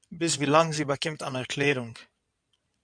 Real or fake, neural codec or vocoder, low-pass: fake; codec, 16 kHz in and 24 kHz out, 2.2 kbps, FireRedTTS-2 codec; 9.9 kHz